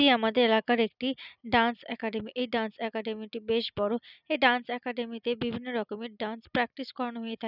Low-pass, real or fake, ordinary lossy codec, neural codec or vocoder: 5.4 kHz; real; none; none